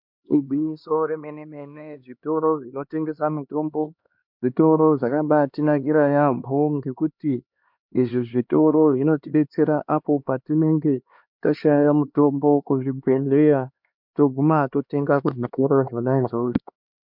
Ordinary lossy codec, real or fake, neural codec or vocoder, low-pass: MP3, 48 kbps; fake; codec, 16 kHz, 2 kbps, X-Codec, HuBERT features, trained on LibriSpeech; 5.4 kHz